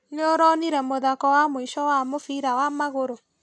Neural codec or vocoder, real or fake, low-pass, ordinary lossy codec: none; real; none; none